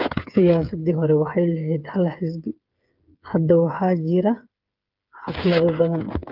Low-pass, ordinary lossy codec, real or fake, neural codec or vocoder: 5.4 kHz; Opus, 32 kbps; fake; codec, 16 kHz, 8 kbps, FreqCodec, smaller model